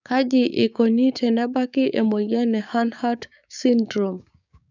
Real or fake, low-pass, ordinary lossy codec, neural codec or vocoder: fake; 7.2 kHz; none; codec, 16 kHz, 6 kbps, DAC